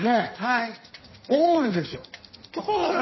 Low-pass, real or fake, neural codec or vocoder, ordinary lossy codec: 7.2 kHz; fake; codec, 16 kHz in and 24 kHz out, 1.1 kbps, FireRedTTS-2 codec; MP3, 24 kbps